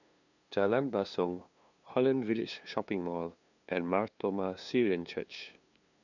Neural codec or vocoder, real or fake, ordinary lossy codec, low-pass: codec, 16 kHz, 2 kbps, FunCodec, trained on LibriTTS, 25 frames a second; fake; none; 7.2 kHz